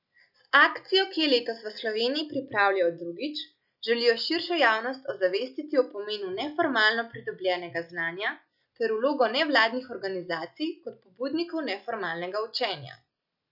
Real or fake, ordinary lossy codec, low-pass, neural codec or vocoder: real; none; 5.4 kHz; none